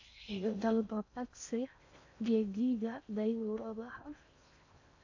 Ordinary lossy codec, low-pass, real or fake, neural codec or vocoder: AAC, 48 kbps; 7.2 kHz; fake; codec, 16 kHz in and 24 kHz out, 0.6 kbps, FocalCodec, streaming, 4096 codes